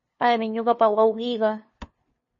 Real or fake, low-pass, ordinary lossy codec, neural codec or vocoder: fake; 7.2 kHz; MP3, 32 kbps; codec, 16 kHz, 2 kbps, FunCodec, trained on LibriTTS, 25 frames a second